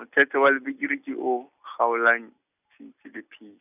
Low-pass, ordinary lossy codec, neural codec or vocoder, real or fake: 3.6 kHz; none; none; real